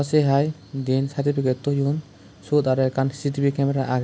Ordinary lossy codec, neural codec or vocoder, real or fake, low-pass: none; none; real; none